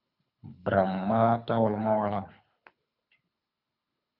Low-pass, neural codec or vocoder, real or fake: 5.4 kHz; codec, 24 kHz, 3 kbps, HILCodec; fake